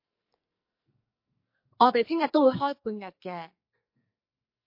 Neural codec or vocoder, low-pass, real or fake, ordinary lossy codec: codec, 44.1 kHz, 2.6 kbps, SNAC; 5.4 kHz; fake; MP3, 32 kbps